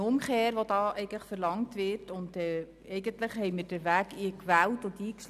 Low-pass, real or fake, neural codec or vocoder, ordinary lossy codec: 14.4 kHz; real; none; none